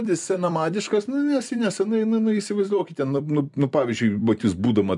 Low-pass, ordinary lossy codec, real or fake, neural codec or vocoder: 10.8 kHz; MP3, 96 kbps; fake; vocoder, 44.1 kHz, 128 mel bands every 256 samples, BigVGAN v2